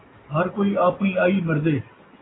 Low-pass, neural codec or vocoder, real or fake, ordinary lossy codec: 7.2 kHz; none; real; AAC, 16 kbps